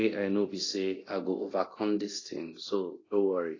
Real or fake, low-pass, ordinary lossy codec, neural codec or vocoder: fake; 7.2 kHz; AAC, 32 kbps; codec, 24 kHz, 0.9 kbps, DualCodec